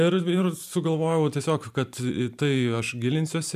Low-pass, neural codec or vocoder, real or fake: 14.4 kHz; vocoder, 44.1 kHz, 128 mel bands every 512 samples, BigVGAN v2; fake